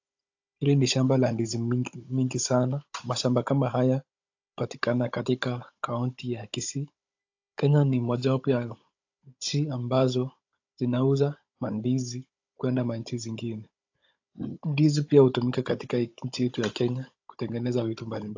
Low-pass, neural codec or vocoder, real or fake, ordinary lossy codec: 7.2 kHz; codec, 16 kHz, 16 kbps, FunCodec, trained on Chinese and English, 50 frames a second; fake; AAC, 48 kbps